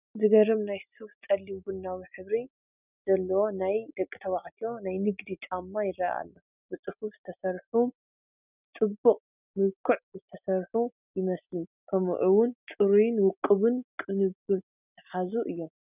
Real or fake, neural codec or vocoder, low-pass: real; none; 3.6 kHz